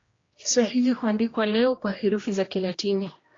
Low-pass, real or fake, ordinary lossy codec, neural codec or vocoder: 7.2 kHz; fake; AAC, 32 kbps; codec, 16 kHz, 1 kbps, X-Codec, HuBERT features, trained on general audio